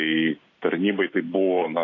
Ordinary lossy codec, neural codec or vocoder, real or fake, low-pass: AAC, 32 kbps; none; real; 7.2 kHz